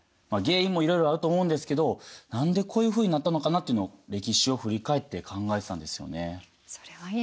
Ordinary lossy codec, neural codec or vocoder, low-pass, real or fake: none; none; none; real